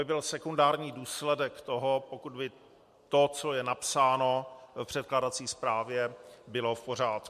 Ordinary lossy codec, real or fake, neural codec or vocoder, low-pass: MP3, 64 kbps; real; none; 14.4 kHz